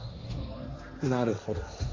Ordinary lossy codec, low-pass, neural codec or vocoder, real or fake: AAC, 32 kbps; 7.2 kHz; codec, 16 kHz, 1.1 kbps, Voila-Tokenizer; fake